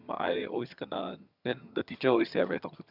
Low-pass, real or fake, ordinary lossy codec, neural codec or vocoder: 5.4 kHz; fake; none; vocoder, 22.05 kHz, 80 mel bands, HiFi-GAN